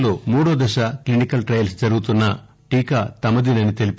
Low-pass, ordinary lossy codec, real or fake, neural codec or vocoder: none; none; real; none